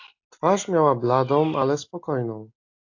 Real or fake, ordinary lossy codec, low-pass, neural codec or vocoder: real; AAC, 48 kbps; 7.2 kHz; none